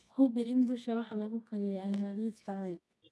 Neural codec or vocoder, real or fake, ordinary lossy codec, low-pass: codec, 24 kHz, 0.9 kbps, WavTokenizer, medium music audio release; fake; none; none